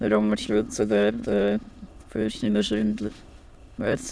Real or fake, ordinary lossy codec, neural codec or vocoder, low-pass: fake; none; autoencoder, 22.05 kHz, a latent of 192 numbers a frame, VITS, trained on many speakers; none